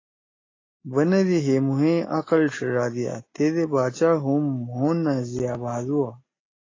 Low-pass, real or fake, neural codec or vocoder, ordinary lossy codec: 7.2 kHz; real; none; AAC, 32 kbps